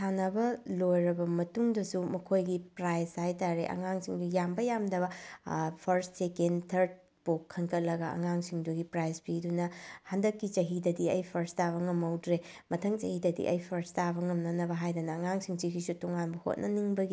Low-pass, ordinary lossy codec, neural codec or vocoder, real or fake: none; none; none; real